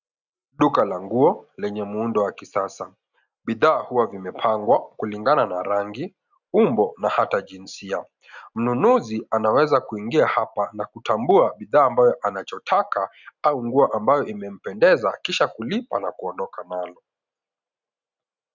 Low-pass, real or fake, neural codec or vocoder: 7.2 kHz; real; none